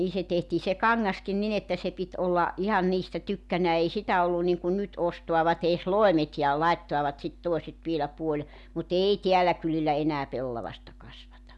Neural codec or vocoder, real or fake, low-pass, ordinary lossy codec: none; real; none; none